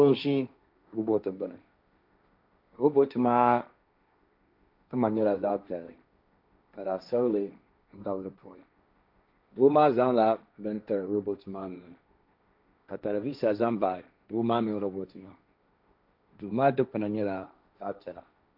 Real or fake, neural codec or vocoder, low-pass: fake; codec, 16 kHz, 1.1 kbps, Voila-Tokenizer; 5.4 kHz